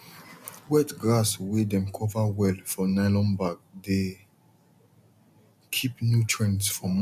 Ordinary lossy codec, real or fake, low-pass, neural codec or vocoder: none; real; 14.4 kHz; none